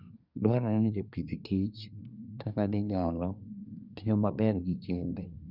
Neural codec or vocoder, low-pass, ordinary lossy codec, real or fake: codec, 24 kHz, 1 kbps, SNAC; 5.4 kHz; none; fake